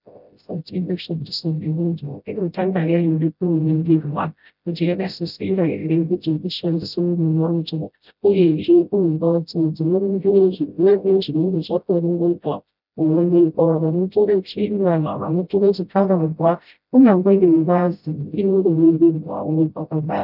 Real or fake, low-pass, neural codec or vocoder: fake; 5.4 kHz; codec, 16 kHz, 0.5 kbps, FreqCodec, smaller model